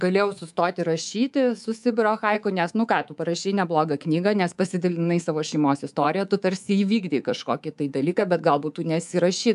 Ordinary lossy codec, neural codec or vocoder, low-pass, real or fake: AAC, 96 kbps; codec, 24 kHz, 3.1 kbps, DualCodec; 10.8 kHz; fake